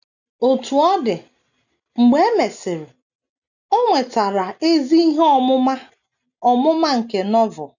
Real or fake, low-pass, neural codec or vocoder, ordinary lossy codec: real; 7.2 kHz; none; none